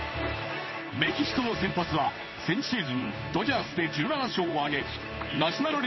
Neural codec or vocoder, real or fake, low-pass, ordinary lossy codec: codec, 16 kHz in and 24 kHz out, 1 kbps, XY-Tokenizer; fake; 7.2 kHz; MP3, 24 kbps